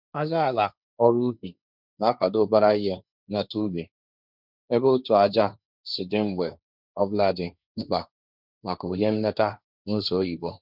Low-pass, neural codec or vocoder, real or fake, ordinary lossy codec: 5.4 kHz; codec, 16 kHz, 1.1 kbps, Voila-Tokenizer; fake; none